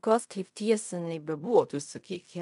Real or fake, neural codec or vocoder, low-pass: fake; codec, 16 kHz in and 24 kHz out, 0.4 kbps, LongCat-Audio-Codec, fine tuned four codebook decoder; 10.8 kHz